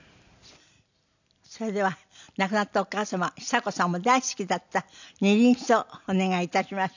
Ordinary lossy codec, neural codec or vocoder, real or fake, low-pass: none; none; real; 7.2 kHz